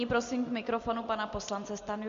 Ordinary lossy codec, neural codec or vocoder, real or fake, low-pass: MP3, 48 kbps; none; real; 7.2 kHz